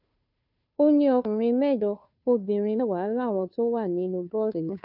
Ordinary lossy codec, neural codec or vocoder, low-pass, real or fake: none; codec, 16 kHz, 2 kbps, FunCodec, trained on Chinese and English, 25 frames a second; 5.4 kHz; fake